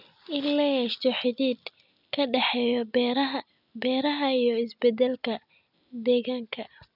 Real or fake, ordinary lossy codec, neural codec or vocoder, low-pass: real; none; none; 5.4 kHz